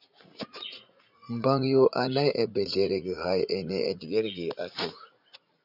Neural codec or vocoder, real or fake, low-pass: vocoder, 44.1 kHz, 80 mel bands, Vocos; fake; 5.4 kHz